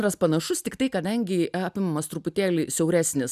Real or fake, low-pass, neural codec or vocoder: real; 14.4 kHz; none